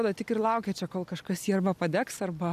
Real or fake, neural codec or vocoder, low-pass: real; none; 14.4 kHz